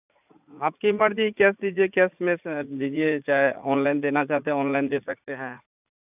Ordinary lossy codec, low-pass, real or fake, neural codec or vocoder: none; 3.6 kHz; fake; vocoder, 44.1 kHz, 80 mel bands, Vocos